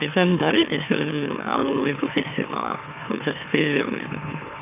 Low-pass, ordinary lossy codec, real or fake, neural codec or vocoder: 3.6 kHz; none; fake; autoencoder, 44.1 kHz, a latent of 192 numbers a frame, MeloTTS